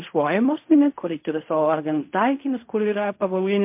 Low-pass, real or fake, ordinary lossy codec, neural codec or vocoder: 3.6 kHz; fake; MP3, 32 kbps; codec, 16 kHz in and 24 kHz out, 0.4 kbps, LongCat-Audio-Codec, fine tuned four codebook decoder